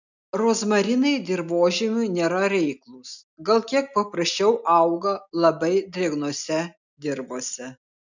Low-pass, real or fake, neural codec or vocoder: 7.2 kHz; real; none